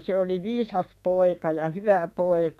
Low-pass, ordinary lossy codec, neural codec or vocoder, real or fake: 14.4 kHz; none; codec, 32 kHz, 1.9 kbps, SNAC; fake